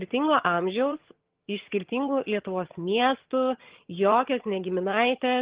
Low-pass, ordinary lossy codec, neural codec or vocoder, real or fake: 3.6 kHz; Opus, 16 kbps; vocoder, 22.05 kHz, 80 mel bands, HiFi-GAN; fake